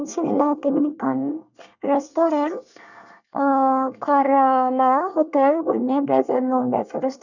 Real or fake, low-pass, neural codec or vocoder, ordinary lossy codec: fake; 7.2 kHz; codec, 24 kHz, 1 kbps, SNAC; none